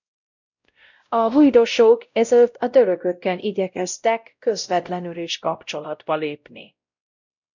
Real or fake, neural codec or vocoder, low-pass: fake; codec, 16 kHz, 0.5 kbps, X-Codec, WavLM features, trained on Multilingual LibriSpeech; 7.2 kHz